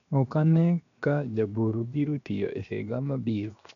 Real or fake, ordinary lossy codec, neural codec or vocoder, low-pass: fake; none; codec, 16 kHz, 0.7 kbps, FocalCodec; 7.2 kHz